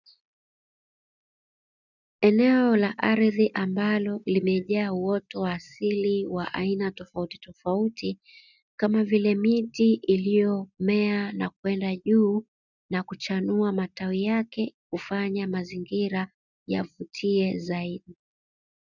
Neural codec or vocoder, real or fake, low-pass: none; real; 7.2 kHz